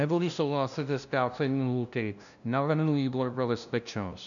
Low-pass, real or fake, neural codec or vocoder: 7.2 kHz; fake; codec, 16 kHz, 0.5 kbps, FunCodec, trained on LibriTTS, 25 frames a second